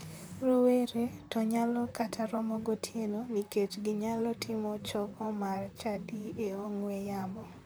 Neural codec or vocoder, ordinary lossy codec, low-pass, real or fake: vocoder, 44.1 kHz, 128 mel bands, Pupu-Vocoder; none; none; fake